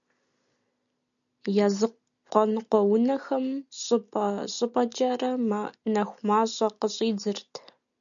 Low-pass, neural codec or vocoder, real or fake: 7.2 kHz; none; real